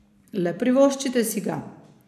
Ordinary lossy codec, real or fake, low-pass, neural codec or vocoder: none; fake; 14.4 kHz; vocoder, 44.1 kHz, 128 mel bands every 256 samples, BigVGAN v2